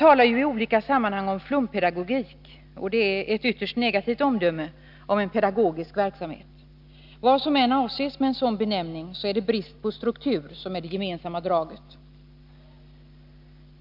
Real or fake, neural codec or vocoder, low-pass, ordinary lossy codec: real; none; 5.4 kHz; none